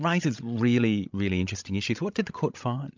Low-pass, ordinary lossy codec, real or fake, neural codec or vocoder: 7.2 kHz; MP3, 64 kbps; fake; codec, 16 kHz, 16 kbps, FreqCodec, larger model